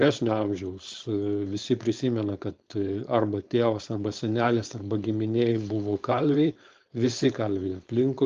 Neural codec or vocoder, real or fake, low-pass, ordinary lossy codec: codec, 16 kHz, 4.8 kbps, FACodec; fake; 7.2 kHz; Opus, 32 kbps